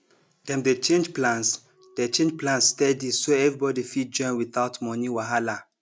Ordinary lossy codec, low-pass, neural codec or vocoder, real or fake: none; none; none; real